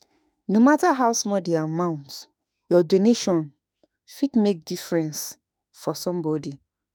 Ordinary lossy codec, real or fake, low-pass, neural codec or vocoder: none; fake; none; autoencoder, 48 kHz, 32 numbers a frame, DAC-VAE, trained on Japanese speech